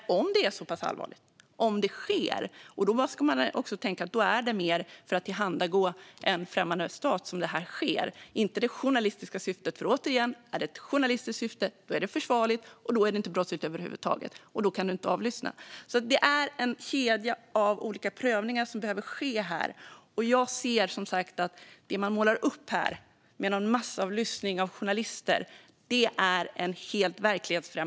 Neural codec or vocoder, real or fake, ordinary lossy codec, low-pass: none; real; none; none